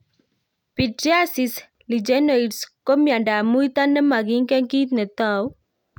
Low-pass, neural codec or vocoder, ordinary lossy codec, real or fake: 19.8 kHz; none; none; real